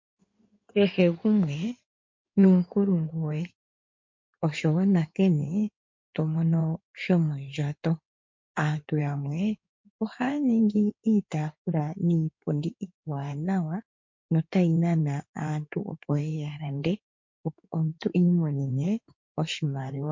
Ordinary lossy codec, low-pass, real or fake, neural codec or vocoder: MP3, 48 kbps; 7.2 kHz; fake; codec, 16 kHz in and 24 kHz out, 2.2 kbps, FireRedTTS-2 codec